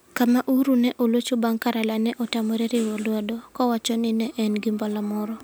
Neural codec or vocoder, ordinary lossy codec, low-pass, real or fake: none; none; none; real